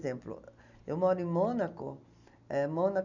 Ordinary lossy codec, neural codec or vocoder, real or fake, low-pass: none; none; real; 7.2 kHz